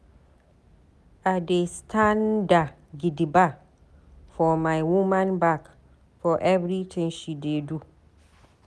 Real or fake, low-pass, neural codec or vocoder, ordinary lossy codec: real; none; none; none